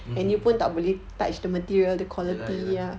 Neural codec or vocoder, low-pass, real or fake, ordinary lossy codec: none; none; real; none